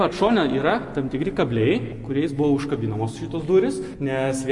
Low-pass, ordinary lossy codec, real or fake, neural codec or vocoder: 10.8 kHz; MP3, 48 kbps; real; none